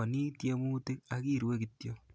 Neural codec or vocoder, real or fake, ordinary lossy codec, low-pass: none; real; none; none